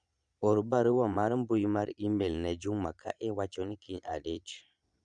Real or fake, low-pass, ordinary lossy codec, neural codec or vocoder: fake; 9.9 kHz; none; vocoder, 22.05 kHz, 80 mel bands, Vocos